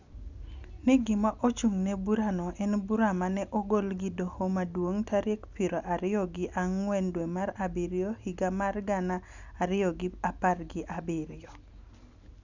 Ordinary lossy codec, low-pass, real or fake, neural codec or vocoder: none; 7.2 kHz; real; none